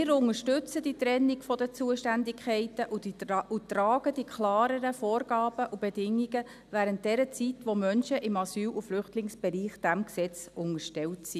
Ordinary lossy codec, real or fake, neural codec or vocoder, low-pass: none; real; none; 14.4 kHz